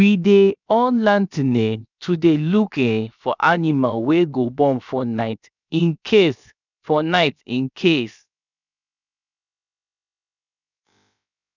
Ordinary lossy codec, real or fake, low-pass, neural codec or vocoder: none; fake; 7.2 kHz; codec, 16 kHz, 0.7 kbps, FocalCodec